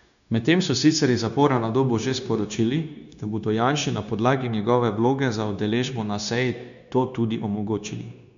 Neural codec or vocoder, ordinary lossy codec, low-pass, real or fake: codec, 16 kHz, 0.9 kbps, LongCat-Audio-Codec; none; 7.2 kHz; fake